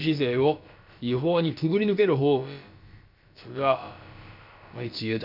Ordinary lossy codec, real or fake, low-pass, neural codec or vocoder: none; fake; 5.4 kHz; codec, 16 kHz, about 1 kbps, DyCAST, with the encoder's durations